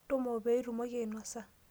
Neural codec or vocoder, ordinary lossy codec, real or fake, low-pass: none; none; real; none